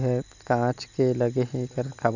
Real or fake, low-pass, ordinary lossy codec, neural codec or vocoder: real; 7.2 kHz; none; none